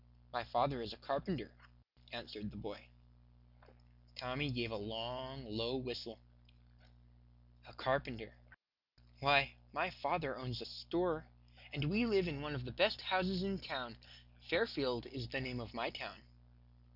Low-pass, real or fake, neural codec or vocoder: 5.4 kHz; real; none